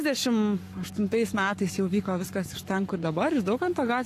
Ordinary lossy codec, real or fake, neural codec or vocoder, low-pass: AAC, 64 kbps; fake; codec, 44.1 kHz, 7.8 kbps, Pupu-Codec; 14.4 kHz